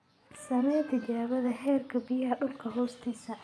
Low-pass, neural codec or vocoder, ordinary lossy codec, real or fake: none; vocoder, 24 kHz, 100 mel bands, Vocos; none; fake